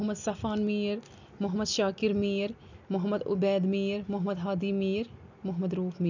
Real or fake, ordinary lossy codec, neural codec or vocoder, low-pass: real; none; none; 7.2 kHz